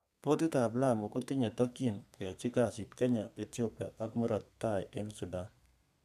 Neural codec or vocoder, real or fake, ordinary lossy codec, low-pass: codec, 32 kHz, 1.9 kbps, SNAC; fake; none; 14.4 kHz